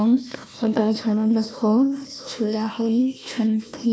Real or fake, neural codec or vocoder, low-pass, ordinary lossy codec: fake; codec, 16 kHz, 1 kbps, FunCodec, trained on Chinese and English, 50 frames a second; none; none